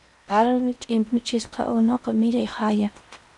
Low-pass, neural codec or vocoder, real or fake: 10.8 kHz; codec, 16 kHz in and 24 kHz out, 0.8 kbps, FocalCodec, streaming, 65536 codes; fake